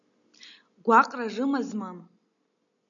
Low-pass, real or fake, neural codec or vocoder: 7.2 kHz; real; none